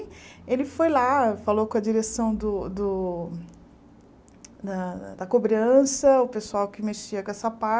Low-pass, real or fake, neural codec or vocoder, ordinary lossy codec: none; real; none; none